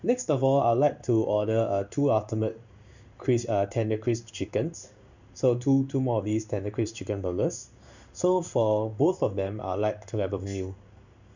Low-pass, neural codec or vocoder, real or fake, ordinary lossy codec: 7.2 kHz; codec, 16 kHz in and 24 kHz out, 1 kbps, XY-Tokenizer; fake; none